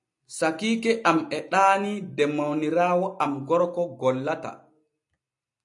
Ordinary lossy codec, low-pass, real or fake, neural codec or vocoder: AAC, 64 kbps; 10.8 kHz; real; none